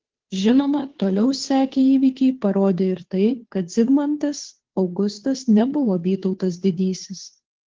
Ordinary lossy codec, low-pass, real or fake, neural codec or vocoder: Opus, 16 kbps; 7.2 kHz; fake; codec, 16 kHz, 2 kbps, FunCodec, trained on Chinese and English, 25 frames a second